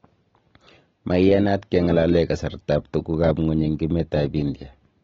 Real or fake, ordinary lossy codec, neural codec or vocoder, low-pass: real; AAC, 24 kbps; none; 7.2 kHz